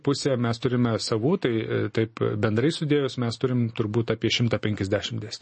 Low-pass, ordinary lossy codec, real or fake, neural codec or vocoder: 10.8 kHz; MP3, 32 kbps; real; none